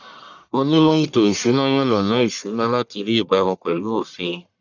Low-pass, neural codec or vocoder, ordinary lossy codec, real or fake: 7.2 kHz; codec, 44.1 kHz, 1.7 kbps, Pupu-Codec; none; fake